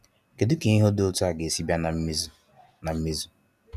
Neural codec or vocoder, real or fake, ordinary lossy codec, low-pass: none; real; none; 14.4 kHz